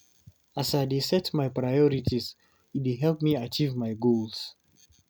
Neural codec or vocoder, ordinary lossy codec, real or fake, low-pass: none; none; real; none